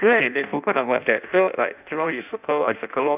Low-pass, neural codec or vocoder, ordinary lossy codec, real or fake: 3.6 kHz; codec, 16 kHz in and 24 kHz out, 0.6 kbps, FireRedTTS-2 codec; none; fake